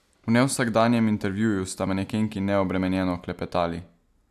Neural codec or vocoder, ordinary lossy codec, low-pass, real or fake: none; AAC, 96 kbps; 14.4 kHz; real